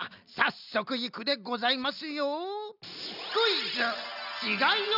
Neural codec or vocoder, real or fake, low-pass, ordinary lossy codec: none; real; 5.4 kHz; none